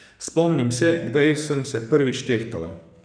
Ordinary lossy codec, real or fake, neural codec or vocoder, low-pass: none; fake; codec, 44.1 kHz, 2.6 kbps, SNAC; 9.9 kHz